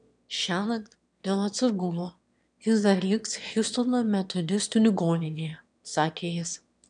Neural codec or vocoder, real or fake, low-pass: autoencoder, 22.05 kHz, a latent of 192 numbers a frame, VITS, trained on one speaker; fake; 9.9 kHz